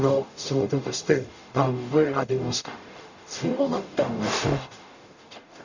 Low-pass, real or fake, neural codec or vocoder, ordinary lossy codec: 7.2 kHz; fake; codec, 44.1 kHz, 0.9 kbps, DAC; none